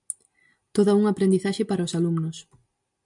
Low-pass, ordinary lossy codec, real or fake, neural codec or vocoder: 10.8 kHz; MP3, 96 kbps; real; none